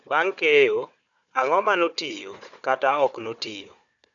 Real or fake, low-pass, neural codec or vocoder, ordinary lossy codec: fake; 7.2 kHz; codec, 16 kHz, 4 kbps, FunCodec, trained on Chinese and English, 50 frames a second; none